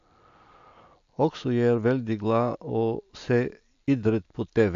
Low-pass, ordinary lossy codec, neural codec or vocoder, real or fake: 7.2 kHz; AAC, 64 kbps; none; real